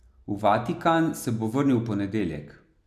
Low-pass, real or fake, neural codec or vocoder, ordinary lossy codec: 14.4 kHz; real; none; none